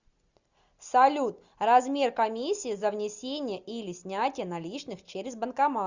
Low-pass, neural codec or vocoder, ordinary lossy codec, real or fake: 7.2 kHz; none; Opus, 64 kbps; real